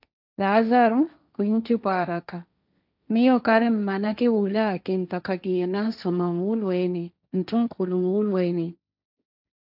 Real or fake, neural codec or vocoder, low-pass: fake; codec, 16 kHz, 1.1 kbps, Voila-Tokenizer; 5.4 kHz